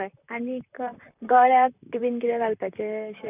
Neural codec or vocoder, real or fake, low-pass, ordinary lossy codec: vocoder, 44.1 kHz, 128 mel bands, Pupu-Vocoder; fake; 3.6 kHz; none